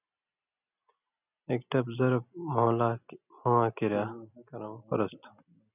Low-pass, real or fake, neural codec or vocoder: 3.6 kHz; real; none